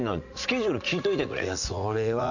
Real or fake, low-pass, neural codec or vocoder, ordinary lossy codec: fake; 7.2 kHz; vocoder, 44.1 kHz, 80 mel bands, Vocos; none